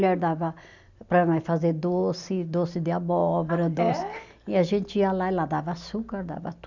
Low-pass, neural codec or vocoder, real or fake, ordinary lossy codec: 7.2 kHz; none; real; none